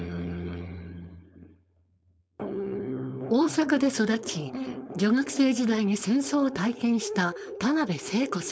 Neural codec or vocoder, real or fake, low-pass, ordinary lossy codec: codec, 16 kHz, 4.8 kbps, FACodec; fake; none; none